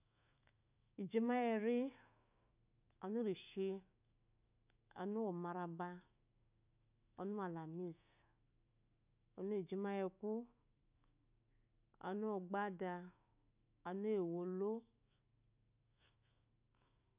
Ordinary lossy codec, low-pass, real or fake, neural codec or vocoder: none; 3.6 kHz; fake; autoencoder, 48 kHz, 128 numbers a frame, DAC-VAE, trained on Japanese speech